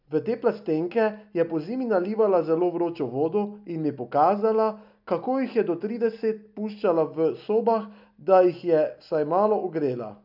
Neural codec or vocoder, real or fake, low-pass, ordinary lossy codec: none; real; 5.4 kHz; none